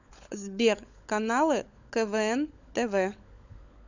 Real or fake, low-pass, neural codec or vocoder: fake; 7.2 kHz; codec, 16 kHz, 16 kbps, FunCodec, trained on LibriTTS, 50 frames a second